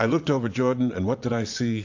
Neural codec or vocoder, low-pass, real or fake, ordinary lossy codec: vocoder, 22.05 kHz, 80 mel bands, Vocos; 7.2 kHz; fake; AAC, 48 kbps